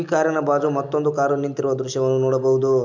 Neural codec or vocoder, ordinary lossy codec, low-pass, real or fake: none; MP3, 64 kbps; 7.2 kHz; real